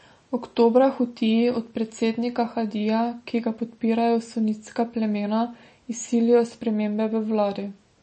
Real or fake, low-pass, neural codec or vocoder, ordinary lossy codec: real; 10.8 kHz; none; MP3, 32 kbps